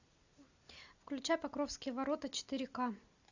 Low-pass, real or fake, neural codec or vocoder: 7.2 kHz; real; none